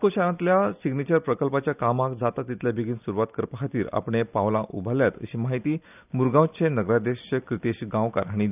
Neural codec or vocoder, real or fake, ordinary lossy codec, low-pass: none; real; none; 3.6 kHz